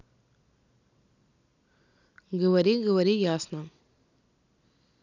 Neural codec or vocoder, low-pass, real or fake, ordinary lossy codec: none; 7.2 kHz; real; none